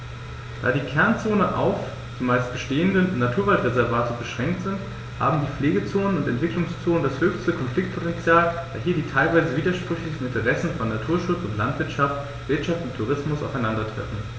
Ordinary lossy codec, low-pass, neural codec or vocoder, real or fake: none; none; none; real